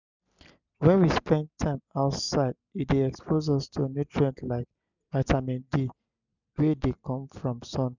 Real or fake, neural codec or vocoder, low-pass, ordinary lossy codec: real; none; 7.2 kHz; none